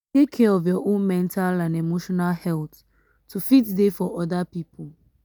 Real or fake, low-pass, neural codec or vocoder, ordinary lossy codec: real; none; none; none